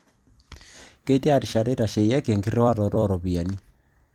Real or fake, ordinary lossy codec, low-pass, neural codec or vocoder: fake; Opus, 32 kbps; 19.8 kHz; vocoder, 44.1 kHz, 128 mel bands every 256 samples, BigVGAN v2